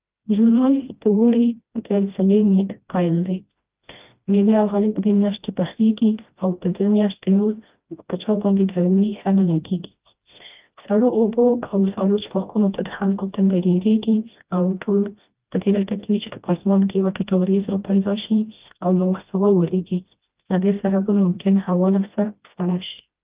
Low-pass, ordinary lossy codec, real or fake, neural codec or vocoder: 3.6 kHz; Opus, 32 kbps; fake; codec, 16 kHz, 1 kbps, FreqCodec, smaller model